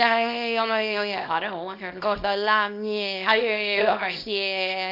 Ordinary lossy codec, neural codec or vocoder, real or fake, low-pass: AAC, 48 kbps; codec, 24 kHz, 0.9 kbps, WavTokenizer, small release; fake; 5.4 kHz